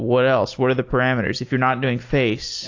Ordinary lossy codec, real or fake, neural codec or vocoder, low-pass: AAC, 48 kbps; real; none; 7.2 kHz